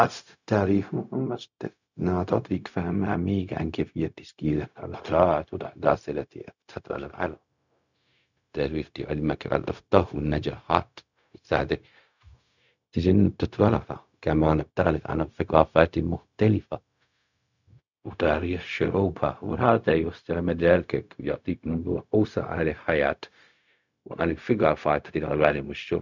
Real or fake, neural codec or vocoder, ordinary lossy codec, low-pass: fake; codec, 16 kHz, 0.4 kbps, LongCat-Audio-Codec; none; 7.2 kHz